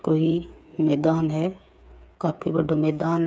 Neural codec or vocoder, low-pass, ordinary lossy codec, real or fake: codec, 16 kHz, 8 kbps, FreqCodec, smaller model; none; none; fake